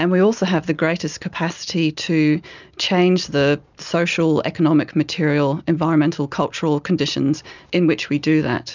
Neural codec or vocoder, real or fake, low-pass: none; real; 7.2 kHz